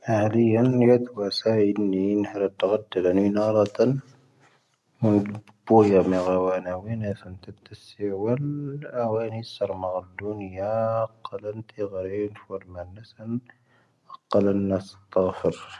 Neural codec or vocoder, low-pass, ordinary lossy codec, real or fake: none; none; none; real